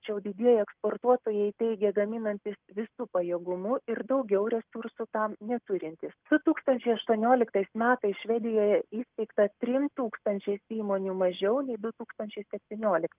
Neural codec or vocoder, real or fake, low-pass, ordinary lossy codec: none; real; 3.6 kHz; Opus, 24 kbps